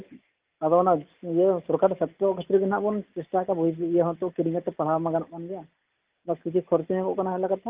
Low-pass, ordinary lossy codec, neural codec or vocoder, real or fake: 3.6 kHz; Opus, 32 kbps; none; real